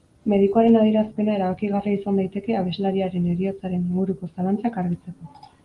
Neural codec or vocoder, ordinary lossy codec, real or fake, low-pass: none; Opus, 24 kbps; real; 10.8 kHz